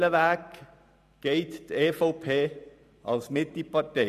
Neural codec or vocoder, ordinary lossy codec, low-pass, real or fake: vocoder, 44.1 kHz, 128 mel bands every 512 samples, BigVGAN v2; none; 14.4 kHz; fake